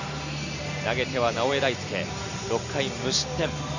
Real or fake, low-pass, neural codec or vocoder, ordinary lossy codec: real; 7.2 kHz; none; none